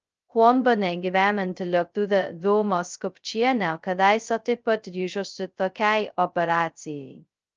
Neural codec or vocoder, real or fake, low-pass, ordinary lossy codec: codec, 16 kHz, 0.2 kbps, FocalCodec; fake; 7.2 kHz; Opus, 24 kbps